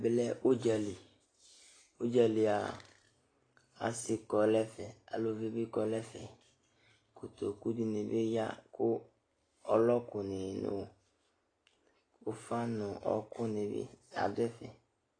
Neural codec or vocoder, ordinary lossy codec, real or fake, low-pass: none; AAC, 32 kbps; real; 9.9 kHz